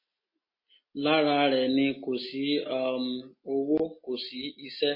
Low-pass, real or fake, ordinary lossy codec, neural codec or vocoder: 5.4 kHz; real; MP3, 24 kbps; none